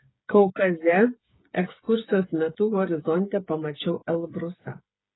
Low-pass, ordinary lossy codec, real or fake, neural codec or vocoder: 7.2 kHz; AAC, 16 kbps; fake; codec, 16 kHz, 8 kbps, FreqCodec, smaller model